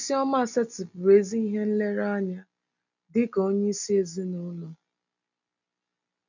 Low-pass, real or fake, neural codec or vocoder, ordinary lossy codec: 7.2 kHz; real; none; none